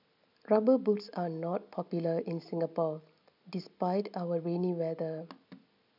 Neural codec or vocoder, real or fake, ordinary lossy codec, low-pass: none; real; none; 5.4 kHz